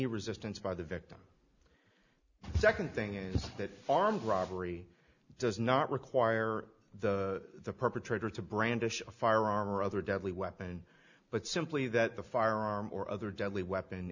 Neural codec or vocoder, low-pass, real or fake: none; 7.2 kHz; real